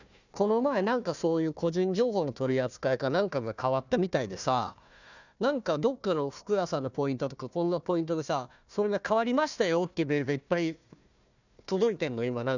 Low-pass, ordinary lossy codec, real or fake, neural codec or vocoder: 7.2 kHz; none; fake; codec, 16 kHz, 1 kbps, FunCodec, trained on Chinese and English, 50 frames a second